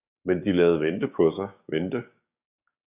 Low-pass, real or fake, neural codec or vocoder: 3.6 kHz; fake; codec, 16 kHz, 6 kbps, DAC